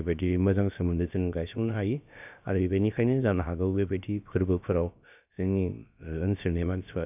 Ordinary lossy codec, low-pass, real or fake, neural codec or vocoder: none; 3.6 kHz; fake; codec, 16 kHz, about 1 kbps, DyCAST, with the encoder's durations